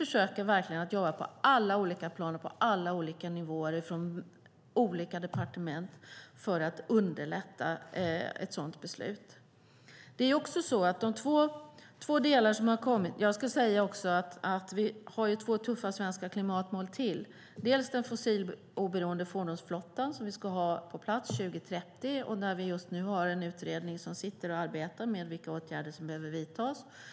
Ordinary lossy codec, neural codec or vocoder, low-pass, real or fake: none; none; none; real